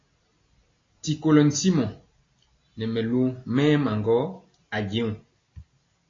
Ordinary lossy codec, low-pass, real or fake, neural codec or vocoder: AAC, 32 kbps; 7.2 kHz; real; none